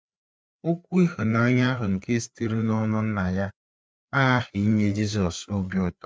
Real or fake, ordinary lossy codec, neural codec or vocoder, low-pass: fake; none; codec, 16 kHz, 4 kbps, FreqCodec, larger model; none